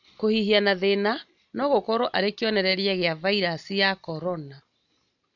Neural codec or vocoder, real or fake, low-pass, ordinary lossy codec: none; real; 7.2 kHz; none